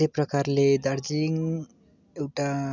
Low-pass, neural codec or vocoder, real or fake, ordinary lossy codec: 7.2 kHz; none; real; none